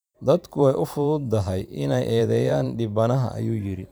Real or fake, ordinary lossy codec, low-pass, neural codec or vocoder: fake; none; none; vocoder, 44.1 kHz, 128 mel bands every 512 samples, BigVGAN v2